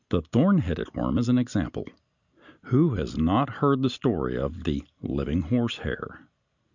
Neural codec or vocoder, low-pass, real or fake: none; 7.2 kHz; real